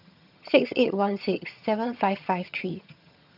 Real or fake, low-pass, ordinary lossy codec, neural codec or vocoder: fake; 5.4 kHz; none; vocoder, 22.05 kHz, 80 mel bands, HiFi-GAN